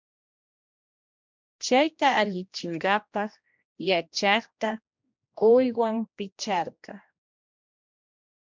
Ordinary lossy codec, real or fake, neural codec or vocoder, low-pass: MP3, 64 kbps; fake; codec, 16 kHz, 1 kbps, X-Codec, HuBERT features, trained on general audio; 7.2 kHz